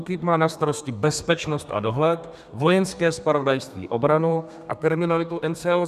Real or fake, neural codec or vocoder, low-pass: fake; codec, 32 kHz, 1.9 kbps, SNAC; 14.4 kHz